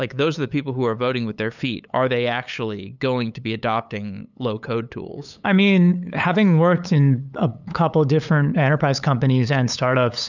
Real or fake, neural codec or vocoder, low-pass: fake; codec, 16 kHz, 8 kbps, FunCodec, trained on LibriTTS, 25 frames a second; 7.2 kHz